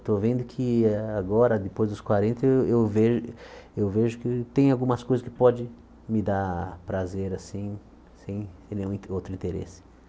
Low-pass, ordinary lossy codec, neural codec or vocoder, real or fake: none; none; none; real